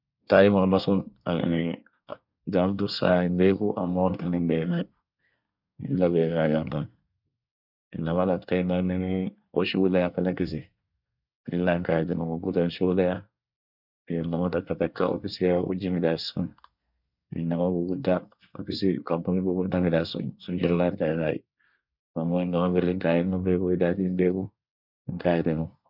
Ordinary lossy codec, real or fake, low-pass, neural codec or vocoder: none; fake; 5.4 kHz; codec, 24 kHz, 1 kbps, SNAC